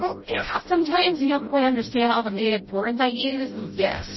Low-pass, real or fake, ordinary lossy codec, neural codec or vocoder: 7.2 kHz; fake; MP3, 24 kbps; codec, 16 kHz, 0.5 kbps, FreqCodec, smaller model